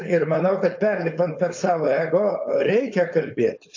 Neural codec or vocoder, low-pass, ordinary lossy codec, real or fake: codec, 16 kHz, 4.8 kbps, FACodec; 7.2 kHz; AAC, 48 kbps; fake